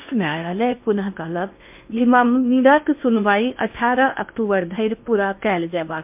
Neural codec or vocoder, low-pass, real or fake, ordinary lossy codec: codec, 16 kHz in and 24 kHz out, 0.8 kbps, FocalCodec, streaming, 65536 codes; 3.6 kHz; fake; MP3, 32 kbps